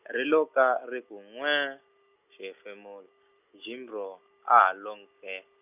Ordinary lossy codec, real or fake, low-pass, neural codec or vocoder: none; real; 3.6 kHz; none